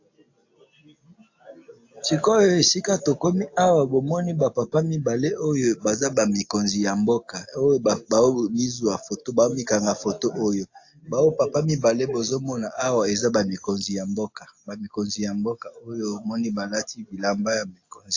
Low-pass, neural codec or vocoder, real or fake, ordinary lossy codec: 7.2 kHz; none; real; AAC, 48 kbps